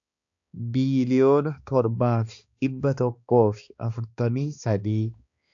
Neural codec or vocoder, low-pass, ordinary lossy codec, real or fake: codec, 16 kHz, 2 kbps, X-Codec, HuBERT features, trained on balanced general audio; 7.2 kHz; AAC, 64 kbps; fake